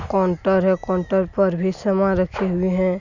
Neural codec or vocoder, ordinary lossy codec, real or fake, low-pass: none; none; real; 7.2 kHz